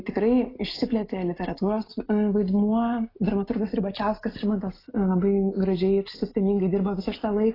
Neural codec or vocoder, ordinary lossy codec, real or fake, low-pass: none; AAC, 24 kbps; real; 5.4 kHz